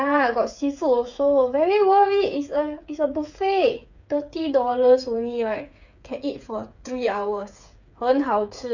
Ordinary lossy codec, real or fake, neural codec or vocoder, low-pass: none; fake; codec, 16 kHz, 8 kbps, FreqCodec, smaller model; 7.2 kHz